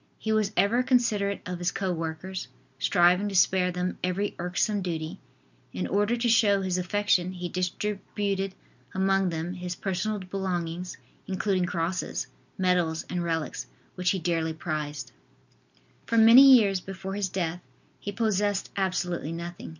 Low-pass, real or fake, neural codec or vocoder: 7.2 kHz; real; none